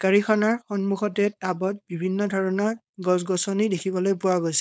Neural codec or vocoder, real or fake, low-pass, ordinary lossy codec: codec, 16 kHz, 4.8 kbps, FACodec; fake; none; none